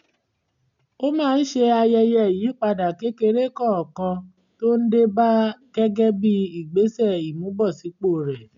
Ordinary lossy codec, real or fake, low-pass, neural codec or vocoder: none; real; 7.2 kHz; none